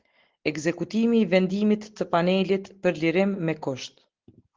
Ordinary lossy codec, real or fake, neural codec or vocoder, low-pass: Opus, 16 kbps; real; none; 7.2 kHz